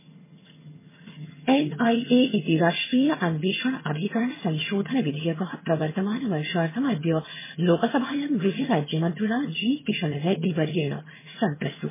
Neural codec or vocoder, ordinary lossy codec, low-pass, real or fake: vocoder, 22.05 kHz, 80 mel bands, HiFi-GAN; MP3, 16 kbps; 3.6 kHz; fake